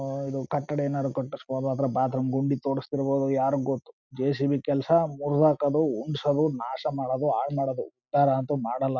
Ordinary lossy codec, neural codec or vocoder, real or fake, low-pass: none; none; real; 7.2 kHz